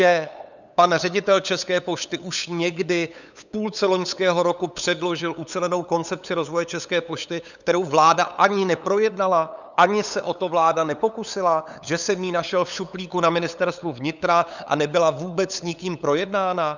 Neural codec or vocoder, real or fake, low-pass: codec, 16 kHz, 8 kbps, FunCodec, trained on LibriTTS, 25 frames a second; fake; 7.2 kHz